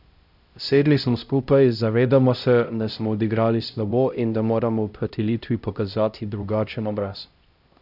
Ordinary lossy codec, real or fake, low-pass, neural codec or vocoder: none; fake; 5.4 kHz; codec, 16 kHz, 0.5 kbps, X-Codec, HuBERT features, trained on LibriSpeech